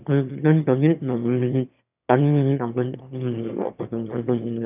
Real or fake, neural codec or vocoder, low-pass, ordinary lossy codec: fake; autoencoder, 22.05 kHz, a latent of 192 numbers a frame, VITS, trained on one speaker; 3.6 kHz; none